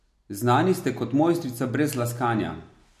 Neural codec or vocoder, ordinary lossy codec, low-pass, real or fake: none; MP3, 64 kbps; 14.4 kHz; real